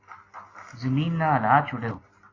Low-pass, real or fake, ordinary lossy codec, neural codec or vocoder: 7.2 kHz; real; AAC, 32 kbps; none